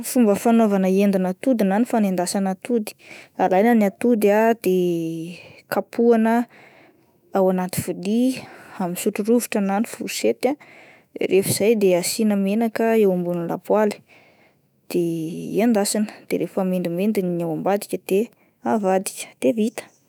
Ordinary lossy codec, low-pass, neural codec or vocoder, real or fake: none; none; autoencoder, 48 kHz, 128 numbers a frame, DAC-VAE, trained on Japanese speech; fake